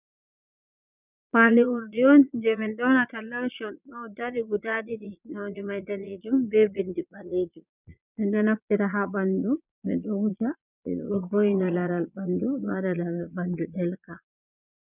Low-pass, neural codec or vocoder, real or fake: 3.6 kHz; vocoder, 22.05 kHz, 80 mel bands, Vocos; fake